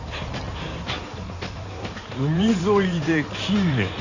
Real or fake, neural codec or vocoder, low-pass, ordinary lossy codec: fake; codec, 16 kHz in and 24 kHz out, 2.2 kbps, FireRedTTS-2 codec; 7.2 kHz; none